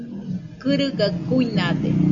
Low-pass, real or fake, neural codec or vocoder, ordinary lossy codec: 7.2 kHz; real; none; MP3, 64 kbps